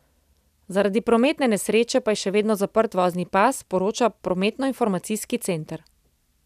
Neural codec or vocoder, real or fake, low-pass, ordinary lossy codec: none; real; 14.4 kHz; none